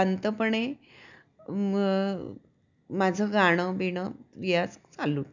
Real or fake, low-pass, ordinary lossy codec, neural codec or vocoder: real; 7.2 kHz; none; none